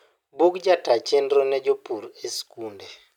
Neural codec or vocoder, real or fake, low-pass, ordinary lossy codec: none; real; 19.8 kHz; none